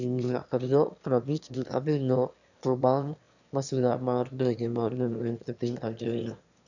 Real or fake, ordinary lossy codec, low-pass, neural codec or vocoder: fake; none; 7.2 kHz; autoencoder, 22.05 kHz, a latent of 192 numbers a frame, VITS, trained on one speaker